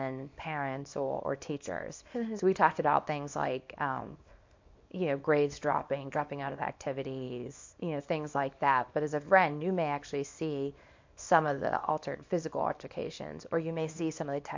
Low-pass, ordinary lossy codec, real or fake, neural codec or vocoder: 7.2 kHz; MP3, 48 kbps; fake; codec, 24 kHz, 0.9 kbps, WavTokenizer, small release